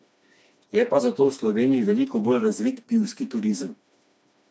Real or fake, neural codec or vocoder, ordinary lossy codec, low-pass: fake; codec, 16 kHz, 2 kbps, FreqCodec, smaller model; none; none